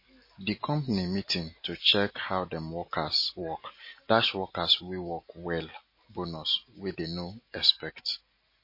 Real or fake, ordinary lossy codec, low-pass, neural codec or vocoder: real; MP3, 24 kbps; 5.4 kHz; none